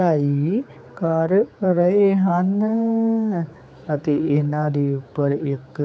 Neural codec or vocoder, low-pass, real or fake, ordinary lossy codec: codec, 16 kHz, 4 kbps, X-Codec, HuBERT features, trained on general audio; none; fake; none